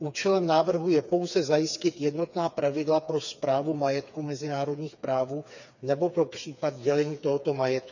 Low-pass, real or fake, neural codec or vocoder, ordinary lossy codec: 7.2 kHz; fake; codec, 16 kHz, 4 kbps, FreqCodec, smaller model; none